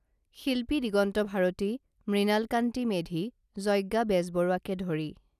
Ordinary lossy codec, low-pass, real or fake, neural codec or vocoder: none; 14.4 kHz; real; none